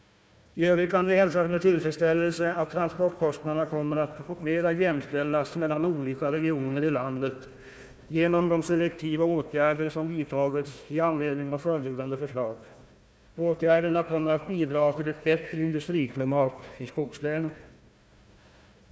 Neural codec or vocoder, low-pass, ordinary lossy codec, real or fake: codec, 16 kHz, 1 kbps, FunCodec, trained on Chinese and English, 50 frames a second; none; none; fake